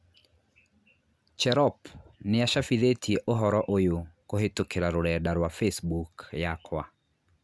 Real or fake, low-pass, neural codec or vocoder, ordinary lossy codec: real; none; none; none